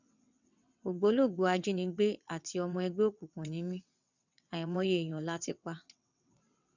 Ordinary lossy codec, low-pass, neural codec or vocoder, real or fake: none; 7.2 kHz; vocoder, 22.05 kHz, 80 mel bands, WaveNeXt; fake